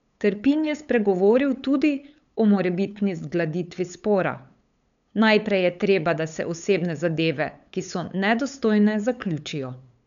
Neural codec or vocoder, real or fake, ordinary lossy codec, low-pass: codec, 16 kHz, 8 kbps, FunCodec, trained on LibriTTS, 25 frames a second; fake; none; 7.2 kHz